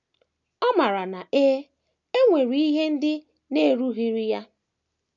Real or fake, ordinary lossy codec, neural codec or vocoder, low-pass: real; none; none; 7.2 kHz